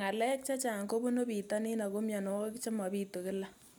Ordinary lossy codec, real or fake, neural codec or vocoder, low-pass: none; real; none; none